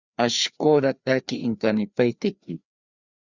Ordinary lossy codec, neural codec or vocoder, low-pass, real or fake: Opus, 64 kbps; codec, 16 kHz, 2 kbps, FreqCodec, larger model; 7.2 kHz; fake